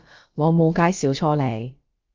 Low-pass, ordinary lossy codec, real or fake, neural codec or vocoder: 7.2 kHz; Opus, 32 kbps; fake; codec, 16 kHz, about 1 kbps, DyCAST, with the encoder's durations